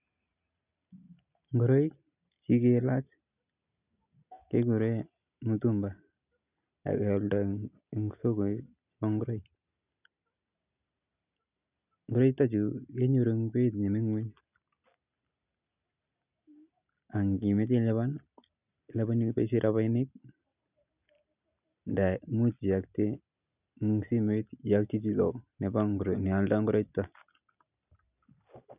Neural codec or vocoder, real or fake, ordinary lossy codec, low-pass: none; real; none; 3.6 kHz